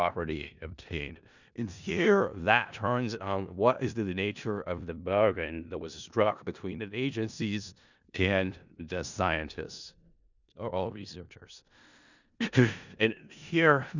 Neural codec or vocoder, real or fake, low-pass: codec, 16 kHz in and 24 kHz out, 0.4 kbps, LongCat-Audio-Codec, four codebook decoder; fake; 7.2 kHz